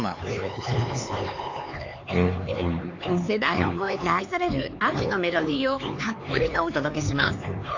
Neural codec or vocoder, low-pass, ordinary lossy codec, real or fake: codec, 16 kHz, 4 kbps, X-Codec, HuBERT features, trained on LibriSpeech; 7.2 kHz; AAC, 48 kbps; fake